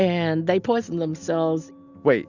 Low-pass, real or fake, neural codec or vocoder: 7.2 kHz; real; none